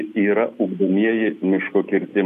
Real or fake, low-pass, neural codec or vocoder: real; 14.4 kHz; none